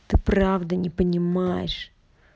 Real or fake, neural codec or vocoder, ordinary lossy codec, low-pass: real; none; none; none